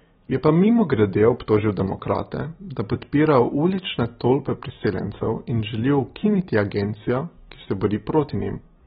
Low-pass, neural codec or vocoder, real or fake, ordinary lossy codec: 19.8 kHz; none; real; AAC, 16 kbps